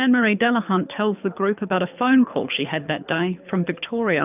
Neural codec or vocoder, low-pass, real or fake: codec, 24 kHz, 6 kbps, HILCodec; 3.6 kHz; fake